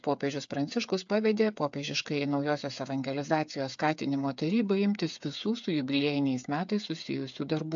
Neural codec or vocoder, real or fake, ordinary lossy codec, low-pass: codec, 16 kHz, 8 kbps, FreqCodec, smaller model; fake; MP3, 64 kbps; 7.2 kHz